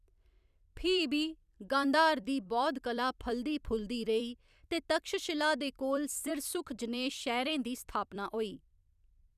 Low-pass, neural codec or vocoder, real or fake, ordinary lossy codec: 14.4 kHz; vocoder, 44.1 kHz, 128 mel bands every 256 samples, BigVGAN v2; fake; none